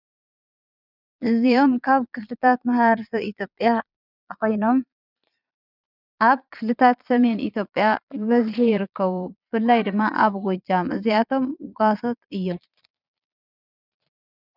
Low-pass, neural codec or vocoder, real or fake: 5.4 kHz; vocoder, 22.05 kHz, 80 mel bands, Vocos; fake